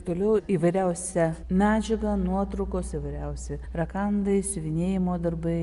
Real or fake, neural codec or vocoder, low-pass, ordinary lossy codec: real; none; 10.8 kHz; MP3, 96 kbps